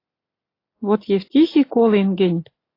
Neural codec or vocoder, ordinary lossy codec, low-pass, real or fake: none; AAC, 32 kbps; 5.4 kHz; real